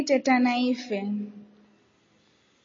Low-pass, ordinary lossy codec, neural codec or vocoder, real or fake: 7.2 kHz; MP3, 32 kbps; none; real